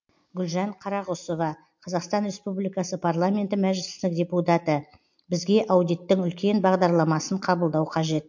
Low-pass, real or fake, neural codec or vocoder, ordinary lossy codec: 7.2 kHz; real; none; MP3, 48 kbps